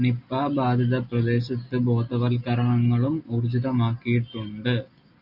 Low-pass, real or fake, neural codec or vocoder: 5.4 kHz; real; none